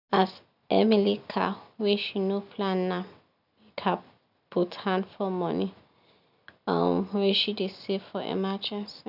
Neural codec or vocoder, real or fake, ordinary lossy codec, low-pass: none; real; none; 5.4 kHz